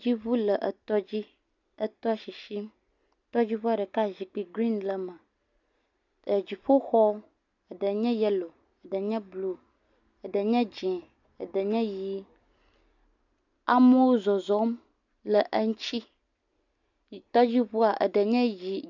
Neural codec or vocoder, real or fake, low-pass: none; real; 7.2 kHz